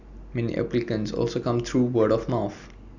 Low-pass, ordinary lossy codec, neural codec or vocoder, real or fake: 7.2 kHz; none; none; real